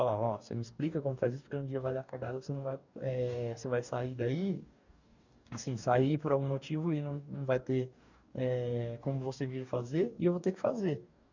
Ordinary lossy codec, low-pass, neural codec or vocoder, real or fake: none; 7.2 kHz; codec, 44.1 kHz, 2.6 kbps, DAC; fake